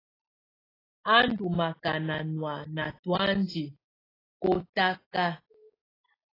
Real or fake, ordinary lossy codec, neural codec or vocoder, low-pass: real; AAC, 24 kbps; none; 5.4 kHz